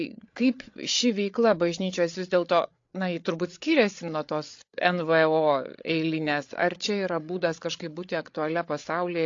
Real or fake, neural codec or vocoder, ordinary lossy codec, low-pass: fake; codec, 16 kHz, 8 kbps, FreqCodec, larger model; AAC, 48 kbps; 7.2 kHz